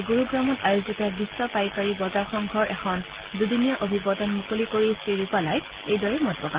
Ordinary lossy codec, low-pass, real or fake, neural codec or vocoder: Opus, 16 kbps; 3.6 kHz; real; none